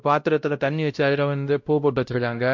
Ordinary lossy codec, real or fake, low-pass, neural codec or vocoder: MP3, 64 kbps; fake; 7.2 kHz; codec, 16 kHz, 0.5 kbps, X-Codec, WavLM features, trained on Multilingual LibriSpeech